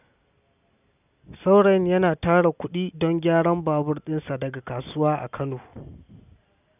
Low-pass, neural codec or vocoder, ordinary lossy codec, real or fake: 3.6 kHz; none; none; real